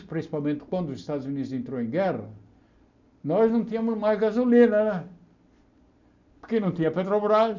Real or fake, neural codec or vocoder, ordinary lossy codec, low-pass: real; none; none; 7.2 kHz